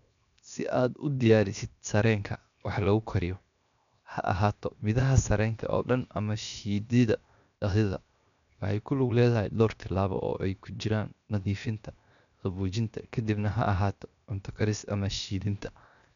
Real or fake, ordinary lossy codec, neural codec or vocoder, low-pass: fake; none; codec, 16 kHz, 0.7 kbps, FocalCodec; 7.2 kHz